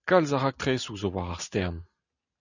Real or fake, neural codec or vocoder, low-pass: real; none; 7.2 kHz